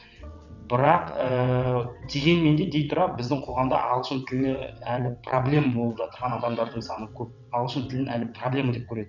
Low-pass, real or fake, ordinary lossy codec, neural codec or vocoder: 7.2 kHz; fake; none; vocoder, 22.05 kHz, 80 mel bands, WaveNeXt